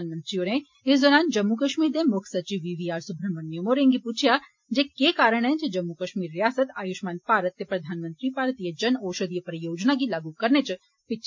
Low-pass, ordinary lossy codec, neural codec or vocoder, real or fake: 7.2 kHz; MP3, 48 kbps; none; real